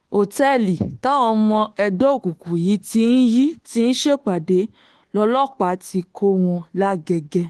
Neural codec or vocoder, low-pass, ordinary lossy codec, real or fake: codec, 24 kHz, 1.2 kbps, DualCodec; 10.8 kHz; Opus, 16 kbps; fake